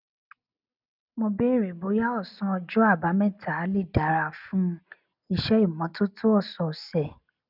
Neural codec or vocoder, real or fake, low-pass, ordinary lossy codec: none; real; 5.4 kHz; none